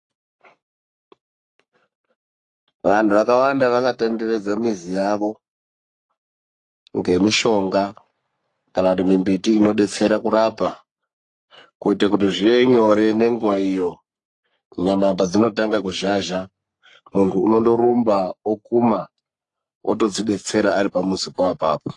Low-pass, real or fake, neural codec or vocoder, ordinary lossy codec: 10.8 kHz; fake; codec, 44.1 kHz, 3.4 kbps, Pupu-Codec; AAC, 48 kbps